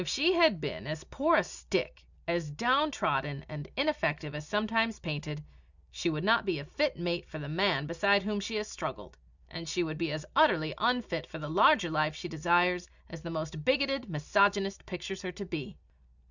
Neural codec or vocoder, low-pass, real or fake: none; 7.2 kHz; real